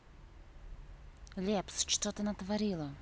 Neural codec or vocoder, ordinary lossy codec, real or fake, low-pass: none; none; real; none